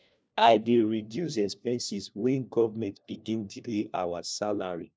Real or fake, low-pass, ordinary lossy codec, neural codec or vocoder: fake; none; none; codec, 16 kHz, 1 kbps, FunCodec, trained on LibriTTS, 50 frames a second